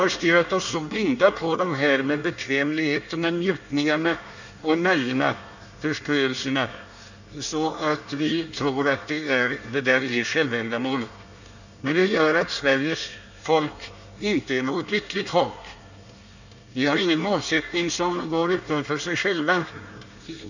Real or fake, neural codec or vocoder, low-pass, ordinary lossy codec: fake; codec, 24 kHz, 1 kbps, SNAC; 7.2 kHz; none